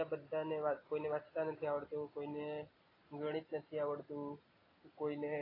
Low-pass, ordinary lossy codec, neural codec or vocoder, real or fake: 5.4 kHz; AAC, 24 kbps; none; real